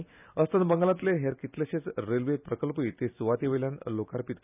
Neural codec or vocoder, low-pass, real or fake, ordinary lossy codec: none; 3.6 kHz; real; none